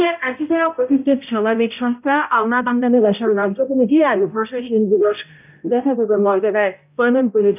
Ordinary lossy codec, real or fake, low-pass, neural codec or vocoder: none; fake; 3.6 kHz; codec, 16 kHz, 0.5 kbps, X-Codec, HuBERT features, trained on balanced general audio